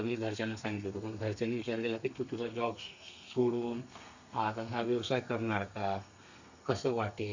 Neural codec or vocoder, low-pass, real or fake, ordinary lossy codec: codec, 32 kHz, 1.9 kbps, SNAC; 7.2 kHz; fake; Opus, 64 kbps